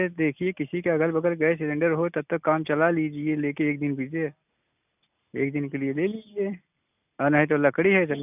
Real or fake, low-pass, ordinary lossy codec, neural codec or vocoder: real; 3.6 kHz; none; none